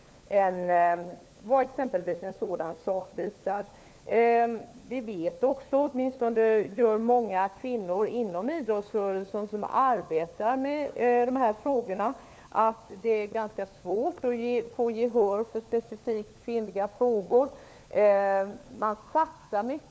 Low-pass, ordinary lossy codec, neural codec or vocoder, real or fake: none; none; codec, 16 kHz, 4 kbps, FunCodec, trained on LibriTTS, 50 frames a second; fake